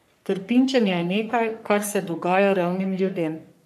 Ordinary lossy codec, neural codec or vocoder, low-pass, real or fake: none; codec, 44.1 kHz, 3.4 kbps, Pupu-Codec; 14.4 kHz; fake